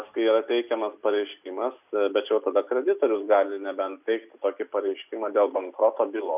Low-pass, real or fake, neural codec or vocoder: 3.6 kHz; real; none